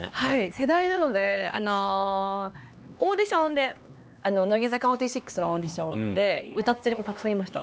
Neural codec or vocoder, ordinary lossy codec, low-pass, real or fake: codec, 16 kHz, 2 kbps, X-Codec, HuBERT features, trained on LibriSpeech; none; none; fake